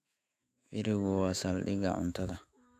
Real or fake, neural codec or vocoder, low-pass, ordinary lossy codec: fake; autoencoder, 48 kHz, 128 numbers a frame, DAC-VAE, trained on Japanese speech; 14.4 kHz; none